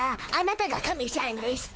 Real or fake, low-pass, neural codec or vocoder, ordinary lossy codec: fake; none; codec, 16 kHz, 4 kbps, X-Codec, WavLM features, trained on Multilingual LibriSpeech; none